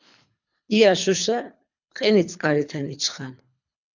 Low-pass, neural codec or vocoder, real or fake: 7.2 kHz; codec, 24 kHz, 3 kbps, HILCodec; fake